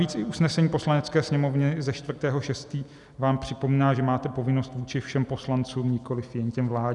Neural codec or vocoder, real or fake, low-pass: none; real; 10.8 kHz